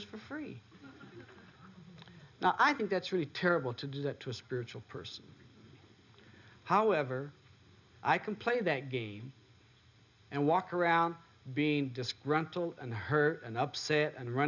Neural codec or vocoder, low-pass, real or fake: none; 7.2 kHz; real